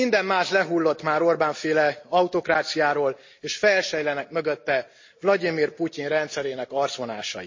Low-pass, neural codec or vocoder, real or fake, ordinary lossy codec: 7.2 kHz; none; real; MP3, 32 kbps